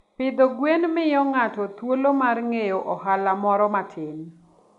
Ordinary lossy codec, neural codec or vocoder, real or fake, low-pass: none; none; real; 10.8 kHz